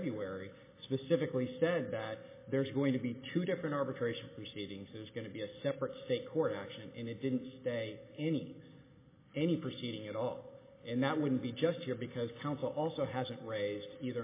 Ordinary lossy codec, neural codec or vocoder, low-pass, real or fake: MP3, 16 kbps; none; 3.6 kHz; real